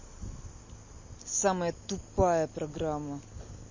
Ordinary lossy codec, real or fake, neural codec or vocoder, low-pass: MP3, 32 kbps; real; none; 7.2 kHz